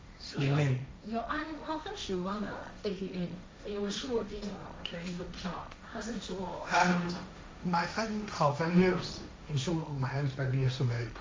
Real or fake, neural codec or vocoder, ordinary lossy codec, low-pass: fake; codec, 16 kHz, 1.1 kbps, Voila-Tokenizer; none; none